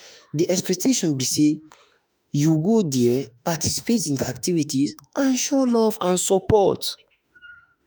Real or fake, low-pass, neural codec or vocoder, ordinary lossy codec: fake; none; autoencoder, 48 kHz, 32 numbers a frame, DAC-VAE, trained on Japanese speech; none